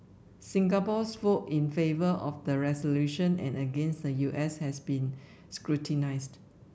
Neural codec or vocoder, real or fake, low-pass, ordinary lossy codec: none; real; none; none